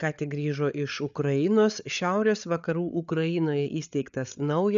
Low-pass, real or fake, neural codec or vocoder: 7.2 kHz; fake; codec, 16 kHz, 8 kbps, FreqCodec, larger model